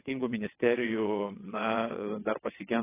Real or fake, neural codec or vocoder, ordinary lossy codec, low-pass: fake; vocoder, 22.05 kHz, 80 mel bands, WaveNeXt; AAC, 16 kbps; 3.6 kHz